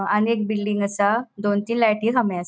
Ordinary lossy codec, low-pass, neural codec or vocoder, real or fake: none; none; none; real